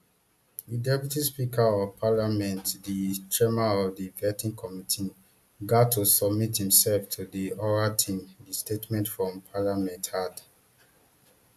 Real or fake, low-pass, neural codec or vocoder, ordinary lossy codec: real; 14.4 kHz; none; AAC, 96 kbps